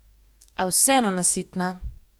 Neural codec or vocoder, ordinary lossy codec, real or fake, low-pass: codec, 44.1 kHz, 2.6 kbps, DAC; none; fake; none